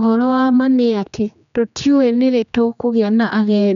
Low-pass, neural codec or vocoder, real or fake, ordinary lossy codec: 7.2 kHz; codec, 16 kHz, 2 kbps, X-Codec, HuBERT features, trained on general audio; fake; none